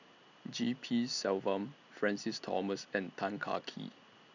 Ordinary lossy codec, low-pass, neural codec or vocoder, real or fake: none; 7.2 kHz; none; real